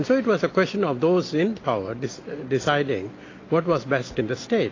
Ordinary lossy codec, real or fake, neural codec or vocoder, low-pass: AAC, 32 kbps; real; none; 7.2 kHz